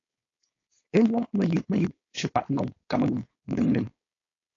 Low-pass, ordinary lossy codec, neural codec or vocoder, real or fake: 7.2 kHz; AAC, 32 kbps; codec, 16 kHz, 4.8 kbps, FACodec; fake